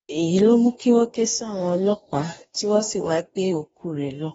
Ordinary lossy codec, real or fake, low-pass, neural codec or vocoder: AAC, 24 kbps; fake; 19.8 kHz; codec, 44.1 kHz, 2.6 kbps, DAC